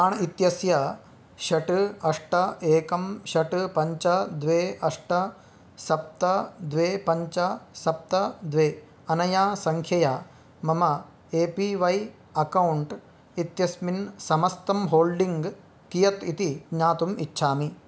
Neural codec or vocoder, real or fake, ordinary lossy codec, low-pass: none; real; none; none